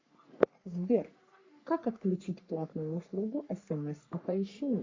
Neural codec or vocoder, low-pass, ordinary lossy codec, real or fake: codec, 44.1 kHz, 3.4 kbps, Pupu-Codec; 7.2 kHz; MP3, 48 kbps; fake